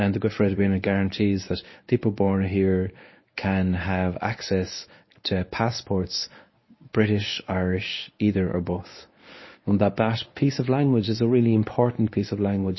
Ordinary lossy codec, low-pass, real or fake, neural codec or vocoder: MP3, 24 kbps; 7.2 kHz; fake; codec, 24 kHz, 0.9 kbps, WavTokenizer, medium speech release version 1